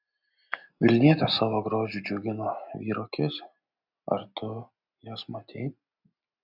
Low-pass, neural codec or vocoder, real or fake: 5.4 kHz; none; real